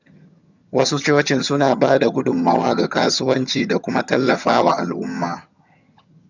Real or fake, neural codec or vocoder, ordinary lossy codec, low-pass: fake; vocoder, 22.05 kHz, 80 mel bands, HiFi-GAN; none; 7.2 kHz